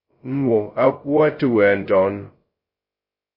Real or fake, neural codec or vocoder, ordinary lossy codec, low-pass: fake; codec, 16 kHz, 0.2 kbps, FocalCodec; MP3, 24 kbps; 5.4 kHz